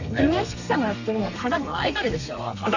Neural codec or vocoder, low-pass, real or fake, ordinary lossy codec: codec, 32 kHz, 1.9 kbps, SNAC; 7.2 kHz; fake; none